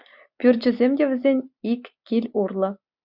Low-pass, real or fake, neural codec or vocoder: 5.4 kHz; real; none